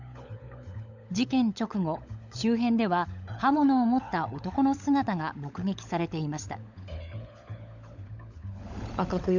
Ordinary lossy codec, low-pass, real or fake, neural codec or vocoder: none; 7.2 kHz; fake; codec, 16 kHz, 16 kbps, FunCodec, trained on LibriTTS, 50 frames a second